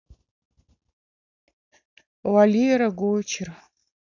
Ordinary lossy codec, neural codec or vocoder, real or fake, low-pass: none; none; real; 7.2 kHz